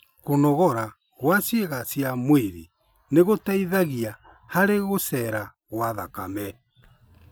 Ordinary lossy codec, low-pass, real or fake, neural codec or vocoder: none; none; real; none